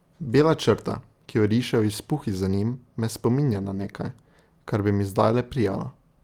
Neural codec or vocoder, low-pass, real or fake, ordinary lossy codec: none; 19.8 kHz; real; Opus, 32 kbps